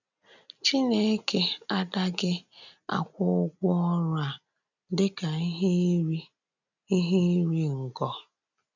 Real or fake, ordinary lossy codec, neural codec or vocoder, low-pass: real; none; none; 7.2 kHz